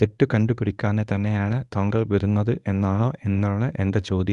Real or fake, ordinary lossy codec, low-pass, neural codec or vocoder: fake; none; 10.8 kHz; codec, 24 kHz, 0.9 kbps, WavTokenizer, medium speech release version 1